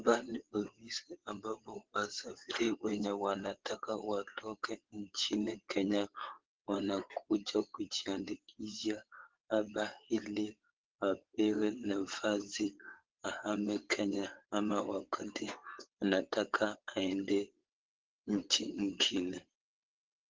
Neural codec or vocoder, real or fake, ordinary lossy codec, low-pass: vocoder, 22.05 kHz, 80 mel bands, WaveNeXt; fake; Opus, 16 kbps; 7.2 kHz